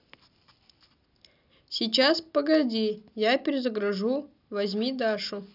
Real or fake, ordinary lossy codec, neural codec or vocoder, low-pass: real; none; none; 5.4 kHz